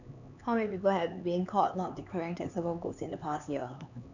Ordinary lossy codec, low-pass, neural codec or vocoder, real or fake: none; 7.2 kHz; codec, 16 kHz, 4 kbps, X-Codec, HuBERT features, trained on LibriSpeech; fake